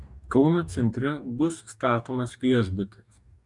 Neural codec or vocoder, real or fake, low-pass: codec, 44.1 kHz, 2.6 kbps, DAC; fake; 10.8 kHz